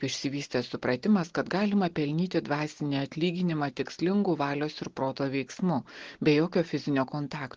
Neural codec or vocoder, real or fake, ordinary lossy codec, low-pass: none; real; Opus, 16 kbps; 7.2 kHz